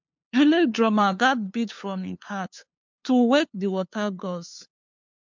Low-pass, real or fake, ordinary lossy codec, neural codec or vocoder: 7.2 kHz; fake; MP3, 64 kbps; codec, 16 kHz, 2 kbps, FunCodec, trained on LibriTTS, 25 frames a second